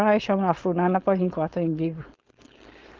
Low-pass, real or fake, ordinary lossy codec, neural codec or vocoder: 7.2 kHz; fake; Opus, 16 kbps; codec, 16 kHz, 4.8 kbps, FACodec